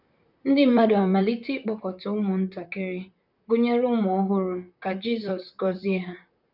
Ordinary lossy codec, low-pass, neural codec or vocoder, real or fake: none; 5.4 kHz; vocoder, 44.1 kHz, 128 mel bands, Pupu-Vocoder; fake